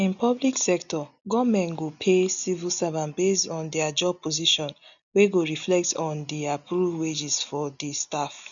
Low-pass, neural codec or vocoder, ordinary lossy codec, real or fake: 7.2 kHz; none; none; real